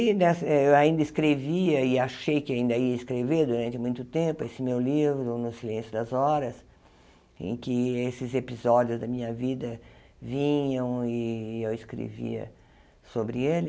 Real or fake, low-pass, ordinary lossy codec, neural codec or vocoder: real; none; none; none